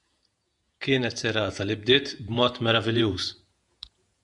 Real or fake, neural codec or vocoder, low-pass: fake; vocoder, 48 kHz, 128 mel bands, Vocos; 10.8 kHz